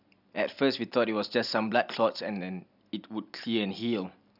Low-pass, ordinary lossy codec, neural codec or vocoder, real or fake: 5.4 kHz; none; none; real